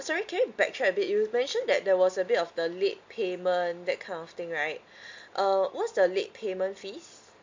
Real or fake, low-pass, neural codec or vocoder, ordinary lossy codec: real; 7.2 kHz; none; MP3, 48 kbps